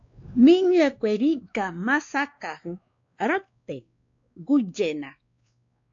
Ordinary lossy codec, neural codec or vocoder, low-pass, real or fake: AAC, 64 kbps; codec, 16 kHz, 2 kbps, X-Codec, WavLM features, trained on Multilingual LibriSpeech; 7.2 kHz; fake